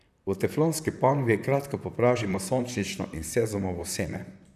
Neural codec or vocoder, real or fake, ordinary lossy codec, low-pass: vocoder, 44.1 kHz, 128 mel bands, Pupu-Vocoder; fake; none; 14.4 kHz